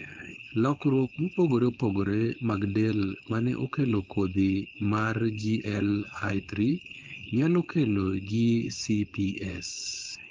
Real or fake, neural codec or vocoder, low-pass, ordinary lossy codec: fake; codec, 16 kHz, 4.8 kbps, FACodec; 7.2 kHz; Opus, 24 kbps